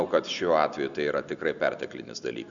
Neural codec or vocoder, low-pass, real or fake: none; 7.2 kHz; real